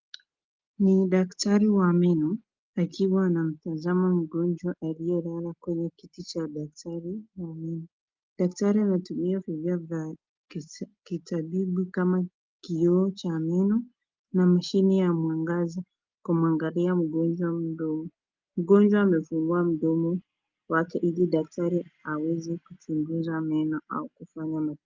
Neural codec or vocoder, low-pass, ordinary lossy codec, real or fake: none; 7.2 kHz; Opus, 32 kbps; real